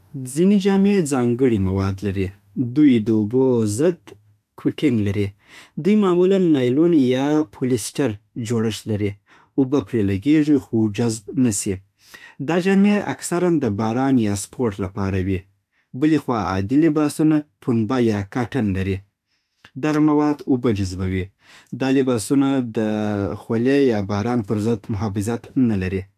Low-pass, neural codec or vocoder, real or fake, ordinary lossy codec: 14.4 kHz; autoencoder, 48 kHz, 32 numbers a frame, DAC-VAE, trained on Japanese speech; fake; AAC, 96 kbps